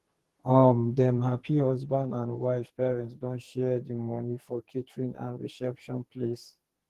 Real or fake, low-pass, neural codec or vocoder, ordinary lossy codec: fake; 14.4 kHz; codec, 44.1 kHz, 2.6 kbps, SNAC; Opus, 16 kbps